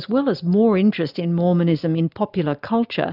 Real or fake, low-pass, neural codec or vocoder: real; 5.4 kHz; none